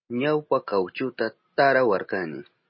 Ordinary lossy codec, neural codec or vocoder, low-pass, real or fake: MP3, 24 kbps; none; 7.2 kHz; real